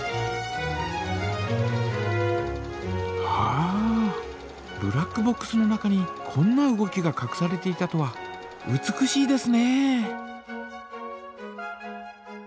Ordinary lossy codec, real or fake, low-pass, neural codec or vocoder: none; real; none; none